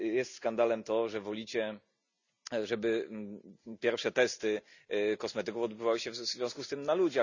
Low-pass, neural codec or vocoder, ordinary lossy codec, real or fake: 7.2 kHz; none; none; real